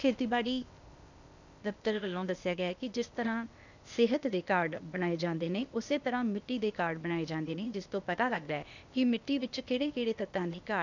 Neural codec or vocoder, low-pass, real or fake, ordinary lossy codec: codec, 16 kHz, 0.8 kbps, ZipCodec; 7.2 kHz; fake; none